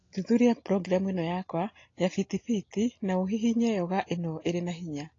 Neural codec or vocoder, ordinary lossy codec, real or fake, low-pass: none; AAC, 32 kbps; real; 7.2 kHz